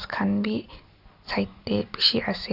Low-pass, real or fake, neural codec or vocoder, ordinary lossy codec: 5.4 kHz; real; none; none